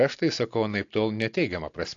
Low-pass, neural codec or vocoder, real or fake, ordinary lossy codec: 7.2 kHz; none; real; AAC, 48 kbps